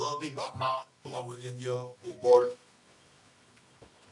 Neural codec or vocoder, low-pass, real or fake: codec, 24 kHz, 0.9 kbps, WavTokenizer, medium music audio release; 10.8 kHz; fake